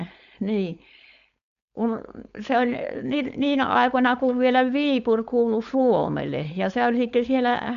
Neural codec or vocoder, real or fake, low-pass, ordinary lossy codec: codec, 16 kHz, 4.8 kbps, FACodec; fake; 7.2 kHz; none